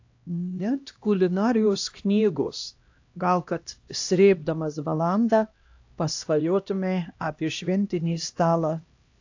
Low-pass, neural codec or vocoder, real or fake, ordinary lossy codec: 7.2 kHz; codec, 16 kHz, 1 kbps, X-Codec, HuBERT features, trained on LibriSpeech; fake; AAC, 48 kbps